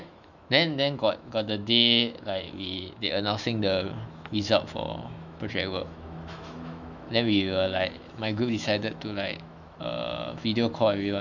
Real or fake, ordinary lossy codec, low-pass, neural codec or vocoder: fake; none; 7.2 kHz; autoencoder, 48 kHz, 128 numbers a frame, DAC-VAE, trained on Japanese speech